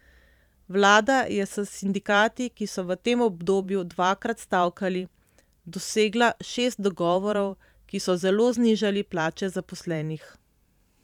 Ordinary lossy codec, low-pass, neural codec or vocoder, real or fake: none; 19.8 kHz; vocoder, 44.1 kHz, 128 mel bands every 512 samples, BigVGAN v2; fake